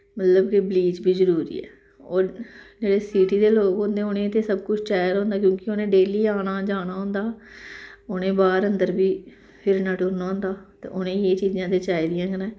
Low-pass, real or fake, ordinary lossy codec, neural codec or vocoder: none; real; none; none